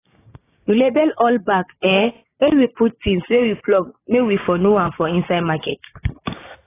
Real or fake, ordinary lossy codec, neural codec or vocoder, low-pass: fake; AAC, 16 kbps; codec, 16 kHz, 6 kbps, DAC; 3.6 kHz